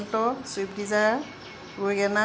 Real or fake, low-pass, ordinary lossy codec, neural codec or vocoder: real; none; none; none